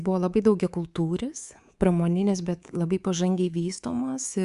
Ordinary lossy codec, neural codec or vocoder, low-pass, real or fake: Opus, 64 kbps; codec, 24 kHz, 3.1 kbps, DualCodec; 10.8 kHz; fake